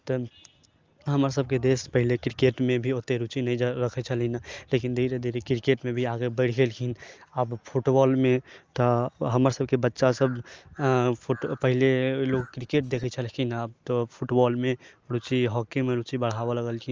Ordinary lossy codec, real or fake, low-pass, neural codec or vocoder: Opus, 24 kbps; real; 7.2 kHz; none